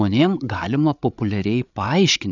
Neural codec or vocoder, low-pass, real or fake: codec, 16 kHz, 8 kbps, FreqCodec, larger model; 7.2 kHz; fake